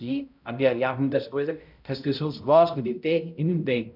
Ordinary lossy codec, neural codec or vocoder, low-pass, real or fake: none; codec, 16 kHz, 0.5 kbps, X-Codec, HuBERT features, trained on balanced general audio; 5.4 kHz; fake